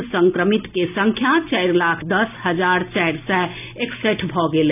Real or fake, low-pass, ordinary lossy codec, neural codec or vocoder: real; 3.6 kHz; none; none